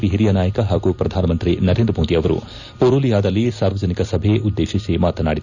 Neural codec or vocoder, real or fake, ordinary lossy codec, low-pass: none; real; none; 7.2 kHz